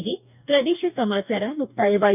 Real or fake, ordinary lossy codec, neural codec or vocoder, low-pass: fake; none; codec, 44.1 kHz, 2.6 kbps, DAC; 3.6 kHz